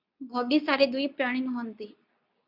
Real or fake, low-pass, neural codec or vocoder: fake; 5.4 kHz; codec, 24 kHz, 0.9 kbps, WavTokenizer, medium speech release version 2